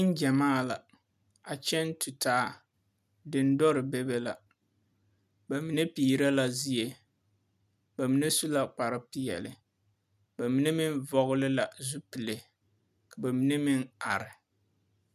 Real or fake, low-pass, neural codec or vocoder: real; 14.4 kHz; none